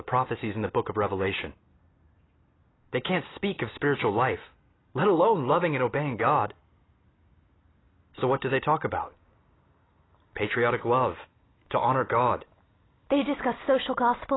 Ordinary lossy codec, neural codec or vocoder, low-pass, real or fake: AAC, 16 kbps; none; 7.2 kHz; real